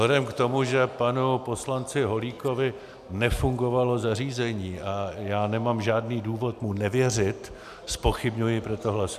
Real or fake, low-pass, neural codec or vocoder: real; 14.4 kHz; none